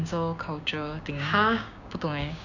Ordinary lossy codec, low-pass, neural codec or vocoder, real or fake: none; 7.2 kHz; codec, 16 kHz, 6 kbps, DAC; fake